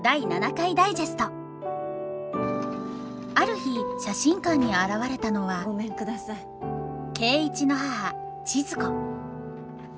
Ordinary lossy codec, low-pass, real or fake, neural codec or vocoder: none; none; real; none